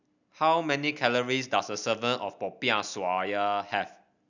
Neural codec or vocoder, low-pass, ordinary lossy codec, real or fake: none; 7.2 kHz; none; real